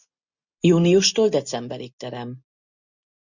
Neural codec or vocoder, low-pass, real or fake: none; 7.2 kHz; real